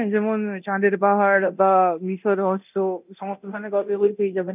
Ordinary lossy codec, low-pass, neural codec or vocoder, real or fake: none; 3.6 kHz; codec, 24 kHz, 0.9 kbps, DualCodec; fake